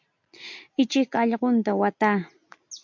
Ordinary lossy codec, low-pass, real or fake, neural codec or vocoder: MP3, 48 kbps; 7.2 kHz; real; none